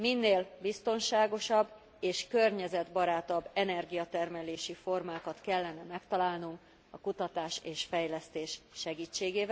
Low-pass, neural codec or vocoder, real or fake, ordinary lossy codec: none; none; real; none